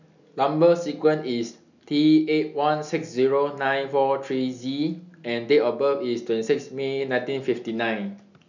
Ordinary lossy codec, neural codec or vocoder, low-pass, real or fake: none; none; 7.2 kHz; real